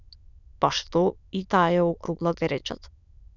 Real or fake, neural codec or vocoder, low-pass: fake; autoencoder, 22.05 kHz, a latent of 192 numbers a frame, VITS, trained on many speakers; 7.2 kHz